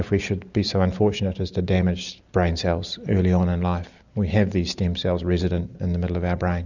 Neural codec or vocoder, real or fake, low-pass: none; real; 7.2 kHz